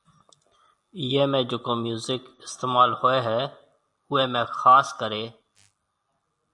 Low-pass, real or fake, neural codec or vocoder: 10.8 kHz; real; none